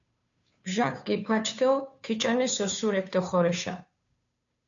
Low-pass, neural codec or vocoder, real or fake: 7.2 kHz; codec, 16 kHz, 2 kbps, FunCodec, trained on Chinese and English, 25 frames a second; fake